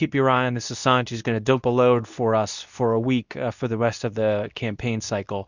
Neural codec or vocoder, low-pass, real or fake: codec, 24 kHz, 0.9 kbps, WavTokenizer, medium speech release version 2; 7.2 kHz; fake